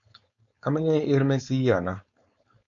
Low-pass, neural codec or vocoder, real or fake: 7.2 kHz; codec, 16 kHz, 4.8 kbps, FACodec; fake